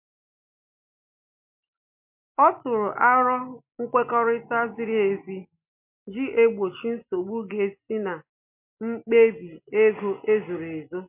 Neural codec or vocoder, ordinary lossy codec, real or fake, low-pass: none; MP3, 32 kbps; real; 3.6 kHz